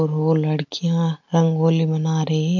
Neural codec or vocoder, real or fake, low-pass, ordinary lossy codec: none; real; 7.2 kHz; MP3, 64 kbps